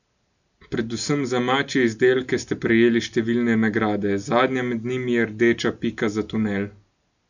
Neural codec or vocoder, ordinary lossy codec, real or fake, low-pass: none; none; real; 7.2 kHz